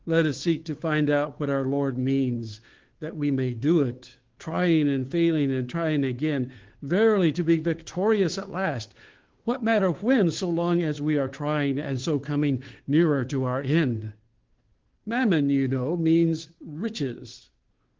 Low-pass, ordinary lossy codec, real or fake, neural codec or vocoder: 7.2 kHz; Opus, 16 kbps; fake; codec, 16 kHz, 2 kbps, FunCodec, trained on Chinese and English, 25 frames a second